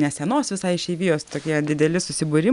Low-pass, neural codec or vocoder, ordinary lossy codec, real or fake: 10.8 kHz; none; MP3, 96 kbps; real